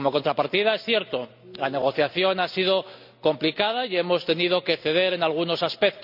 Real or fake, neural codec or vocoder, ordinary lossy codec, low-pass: real; none; none; 5.4 kHz